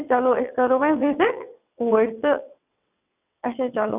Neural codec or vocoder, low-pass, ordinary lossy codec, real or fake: vocoder, 22.05 kHz, 80 mel bands, WaveNeXt; 3.6 kHz; none; fake